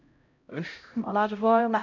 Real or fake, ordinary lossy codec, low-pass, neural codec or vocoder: fake; none; 7.2 kHz; codec, 16 kHz, 0.5 kbps, X-Codec, HuBERT features, trained on LibriSpeech